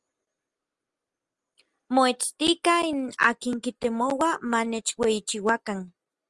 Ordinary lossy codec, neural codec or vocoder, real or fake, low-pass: Opus, 32 kbps; none; real; 10.8 kHz